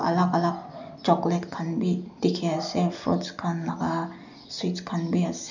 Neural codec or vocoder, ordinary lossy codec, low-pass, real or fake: none; none; 7.2 kHz; real